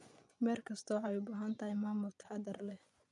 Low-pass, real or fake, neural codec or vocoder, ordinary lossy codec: 10.8 kHz; fake; vocoder, 24 kHz, 100 mel bands, Vocos; none